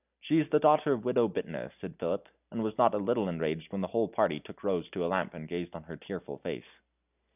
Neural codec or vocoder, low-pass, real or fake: none; 3.6 kHz; real